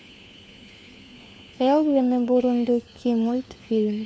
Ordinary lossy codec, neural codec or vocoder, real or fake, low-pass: none; codec, 16 kHz, 4 kbps, FunCodec, trained on LibriTTS, 50 frames a second; fake; none